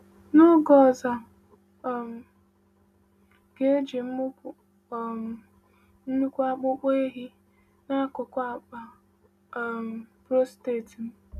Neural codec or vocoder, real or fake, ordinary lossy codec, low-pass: none; real; none; 14.4 kHz